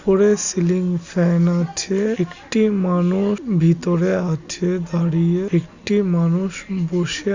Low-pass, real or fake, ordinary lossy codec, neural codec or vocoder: 7.2 kHz; real; Opus, 64 kbps; none